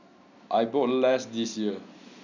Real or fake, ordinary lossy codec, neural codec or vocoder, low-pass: real; none; none; 7.2 kHz